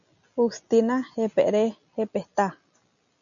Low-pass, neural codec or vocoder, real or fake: 7.2 kHz; none; real